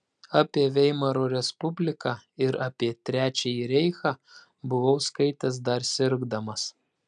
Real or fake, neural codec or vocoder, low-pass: real; none; 9.9 kHz